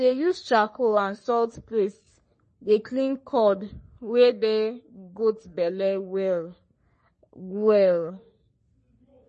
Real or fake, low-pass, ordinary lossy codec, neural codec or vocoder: fake; 10.8 kHz; MP3, 32 kbps; codec, 44.1 kHz, 3.4 kbps, Pupu-Codec